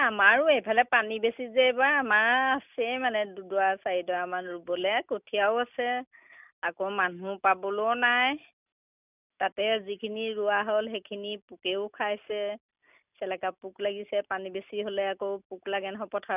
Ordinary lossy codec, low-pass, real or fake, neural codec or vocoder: none; 3.6 kHz; real; none